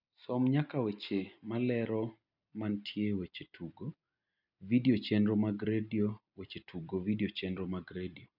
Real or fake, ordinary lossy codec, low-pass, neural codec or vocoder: real; none; 5.4 kHz; none